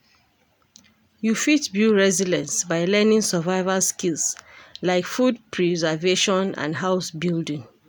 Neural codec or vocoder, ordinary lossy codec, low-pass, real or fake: none; none; none; real